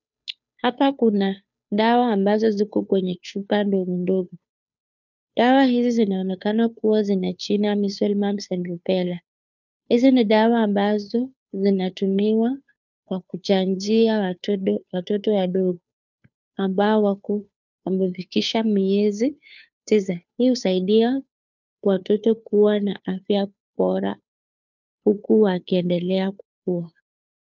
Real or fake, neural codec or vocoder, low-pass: fake; codec, 16 kHz, 2 kbps, FunCodec, trained on Chinese and English, 25 frames a second; 7.2 kHz